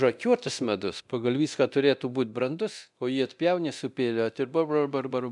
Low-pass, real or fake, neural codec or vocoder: 10.8 kHz; fake; codec, 24 kHz, 0.9 kbps, DualCodec